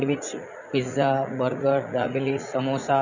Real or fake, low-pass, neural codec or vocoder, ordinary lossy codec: fake; 7.2 kHz; vocoder, 44.1 kHz, 80 mel bands, Vocos; none